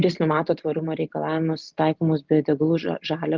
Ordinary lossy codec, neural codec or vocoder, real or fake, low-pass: Opus, 32 kbps; none; real; 7.2 kHz